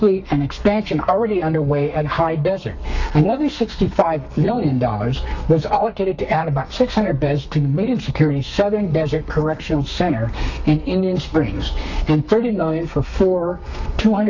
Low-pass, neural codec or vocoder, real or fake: 7.2 kHz; codec, 32 kHz, 1.9 kbps, SNAC; fake